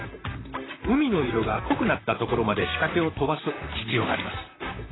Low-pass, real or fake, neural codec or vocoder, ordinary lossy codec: 7.2 kHz; fake; vocoder, 44.1 kHz, 128 mel bands, Pupu-Vocoder; AAC, 16 kbps